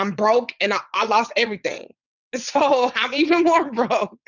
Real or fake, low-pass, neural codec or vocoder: fake; 7.2 kHz; vocoder, 22.05 kHz, 80 mel bands, WaveNeXt